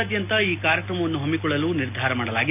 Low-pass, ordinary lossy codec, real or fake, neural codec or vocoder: 3.6 kHz; none; real; none